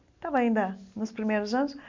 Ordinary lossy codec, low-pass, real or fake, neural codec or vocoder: MP3, 64 kbps; 7.2 kHz; real; none